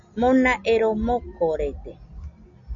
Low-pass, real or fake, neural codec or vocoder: 7.2 kHz; real; none